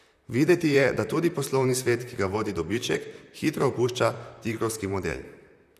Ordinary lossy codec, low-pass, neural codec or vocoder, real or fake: none; 14.4 kHz; vocoder, 44.1 kHz, 128 mel bands, Pupu-Vocoder; fake